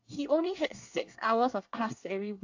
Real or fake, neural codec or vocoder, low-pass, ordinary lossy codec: fake; codec, 24 kHz, 1 kbps, SNAC; 7.2 kHz; AAC, 48 kbps